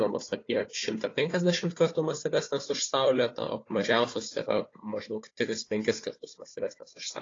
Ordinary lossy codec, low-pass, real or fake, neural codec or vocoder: AAC, 32 kbps; 7.2 kHz; fake; codec, 16 kHz, 4 kbps, FunCodec, trained on Chinese and English, 50 frames a second